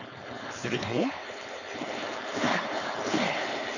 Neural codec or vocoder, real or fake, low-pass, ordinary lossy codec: codec, 16 kHz, 4.8 kbps, FACodec; fake; 7.2 kHz; none